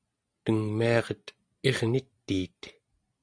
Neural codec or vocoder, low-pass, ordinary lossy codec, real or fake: none; 9.9 kHz; Opus, 64 kbps; real